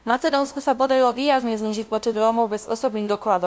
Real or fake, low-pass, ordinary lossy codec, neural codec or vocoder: fake; none; none; codec, 16 kHz, 0.5 kbps, FunCodec, trained on LibriTTS, 25 frames a second